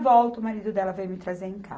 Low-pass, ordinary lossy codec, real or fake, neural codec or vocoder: none; none; real; none